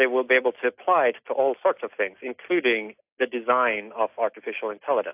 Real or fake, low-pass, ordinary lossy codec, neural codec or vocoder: real; 3.6 kHz; AAC, 32 kbps; none